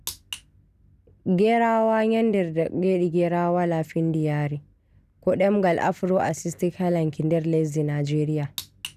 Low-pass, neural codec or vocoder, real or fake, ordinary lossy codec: 14.4 kHz; none; real; none